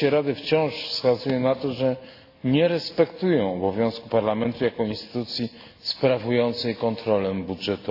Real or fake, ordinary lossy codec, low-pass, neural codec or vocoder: real; AAC, 32 kbps; 5.4 kHz; none